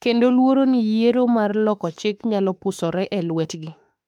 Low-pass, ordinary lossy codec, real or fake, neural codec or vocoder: 19.8 kHz; MP3, 96 kbps; fake; autoencoder, 48 kHz, 32 numbers a frame, DAC-VAE, trained on Japanese speech